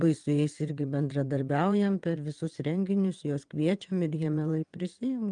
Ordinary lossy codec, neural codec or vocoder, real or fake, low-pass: Opus, 32 kbps; vocoder, 22.05 kHz, 80 mel bands, WaveNeXt; fake; 9.9 kHz